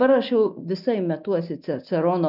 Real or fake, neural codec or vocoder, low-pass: real; none; 5.4 kHz